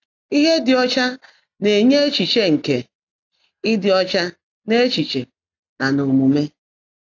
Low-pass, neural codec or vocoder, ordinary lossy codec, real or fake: 7.2 kHz; none; AAC, 48 kbps; real